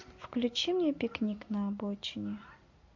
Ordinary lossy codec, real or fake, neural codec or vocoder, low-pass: MP3, 48 kbps; real; none; 7.2 kHz